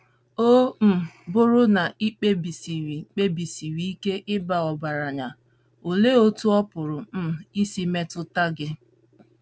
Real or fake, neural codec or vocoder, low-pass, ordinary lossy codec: real; none; none; none